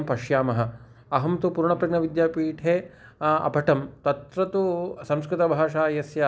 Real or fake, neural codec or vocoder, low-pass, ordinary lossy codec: real; none; none; none